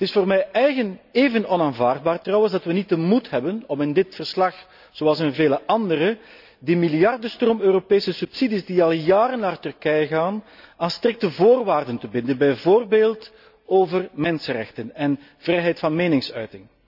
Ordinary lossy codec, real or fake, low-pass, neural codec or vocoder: none; real; 5.4 kHz; none